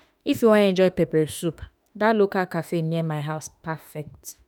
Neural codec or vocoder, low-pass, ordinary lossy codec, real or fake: autoencoder, 48 kHz, 32 numbers a frame, DAC-VAE, trained on Japanese speech; none; none; fake